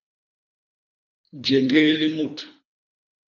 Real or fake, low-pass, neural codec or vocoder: fake; 7.2 kHz; codec, 24 kHz, 3 kbps, HILCodec